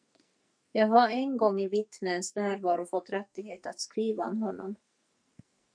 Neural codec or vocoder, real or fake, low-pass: codec, 44.1 kHz, 2.6 kbps, SNAC; fake; 9.9 kHz